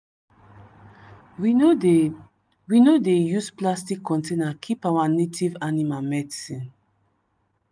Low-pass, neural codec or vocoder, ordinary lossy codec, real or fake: none; none; none; real